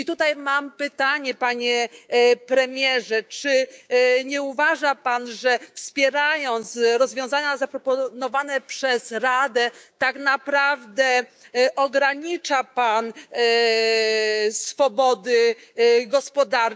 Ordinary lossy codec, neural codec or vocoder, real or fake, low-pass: none; codec, 16 kHz, 6 kbps, DAC; fake; none